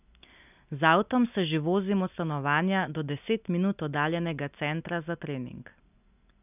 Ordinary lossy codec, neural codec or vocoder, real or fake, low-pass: none; none; real; 3.6 kHz